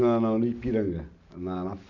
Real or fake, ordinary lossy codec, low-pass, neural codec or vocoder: real; none; 7.2 kHz; none